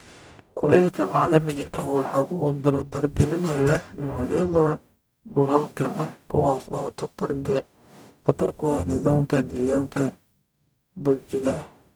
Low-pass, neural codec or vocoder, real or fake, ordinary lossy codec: none; codec, 44.1 kHz, 0.9 kbps, DAC; fake; none